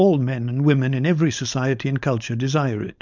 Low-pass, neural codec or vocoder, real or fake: 7.2 kHz; codec, 16 kHz, 4.8 kbps, FACodec; fake